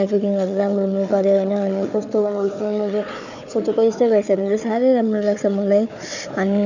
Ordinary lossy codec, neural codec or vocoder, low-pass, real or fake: none; codec, 16 kHz, 4 kbps, FunCodec, trained on Chinese and English, 50 frames a second; 7.2 kHz; fake